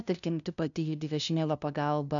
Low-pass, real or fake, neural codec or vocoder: 7.2 kHz; fake; codec, 16 kHz, 0.5 kbps, FunCodec, trained on LibriTTS, 25 frames a second